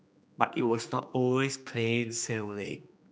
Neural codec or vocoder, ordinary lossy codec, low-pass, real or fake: codec, 16 kHz, 2 kbps, X-Codec, HuBERT features, trained on general audio; none; none; fake